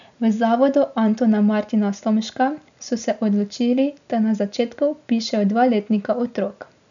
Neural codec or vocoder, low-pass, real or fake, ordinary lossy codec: none; 7.2 kHz; real; none